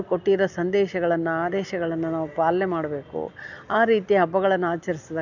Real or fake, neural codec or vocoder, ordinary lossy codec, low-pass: real; none; none; 7.2 kHz